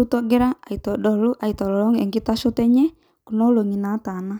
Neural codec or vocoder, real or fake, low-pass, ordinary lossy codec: none; real; none; none